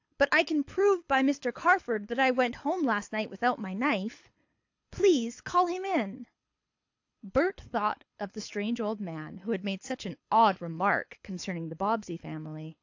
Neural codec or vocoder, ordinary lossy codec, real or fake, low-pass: codec, 24 kHz, 6 kbps, HILCodec; AAC, 48 kbps; fake; 7.2 kHz